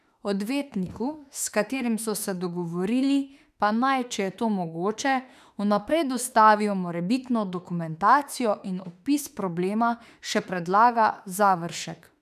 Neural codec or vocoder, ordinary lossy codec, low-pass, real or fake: autoencoder, 48 kHz, 32 numbers a frame, DAC-VAE, trained on Japanese speech; none; 14.4 kHz; fake